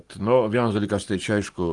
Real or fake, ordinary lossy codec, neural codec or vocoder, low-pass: fake; Opus, 32 kbps; codec, 44.1 kHz, 7.8 kbps, Pupu-Codec; 10.8 kHz